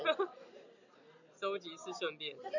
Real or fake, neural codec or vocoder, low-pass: real; none; 7.2 kHz